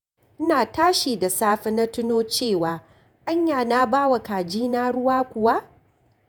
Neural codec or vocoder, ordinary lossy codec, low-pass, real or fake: vocoder, 48 kHz, 128 mel bands, Vocos; none; none; fake